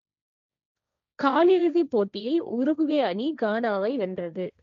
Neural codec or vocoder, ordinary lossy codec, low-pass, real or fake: codec, 16 kHz, 1.1 kbps, Voila-Tokenizer; none; 7.2 kHz; fake